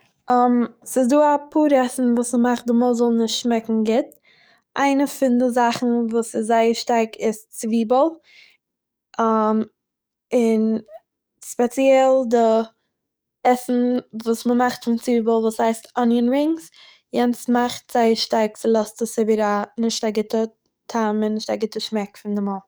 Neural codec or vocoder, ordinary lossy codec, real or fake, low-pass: codec, 44.1 kHz, 7.8 kbps, DAC; none; fake; none